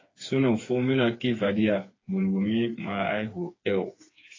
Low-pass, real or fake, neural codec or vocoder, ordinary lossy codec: 7.2 kHz; fake; codec, 16 kHz, 4 kbps, FreqCodec, smaller model; AAC, 32 kbps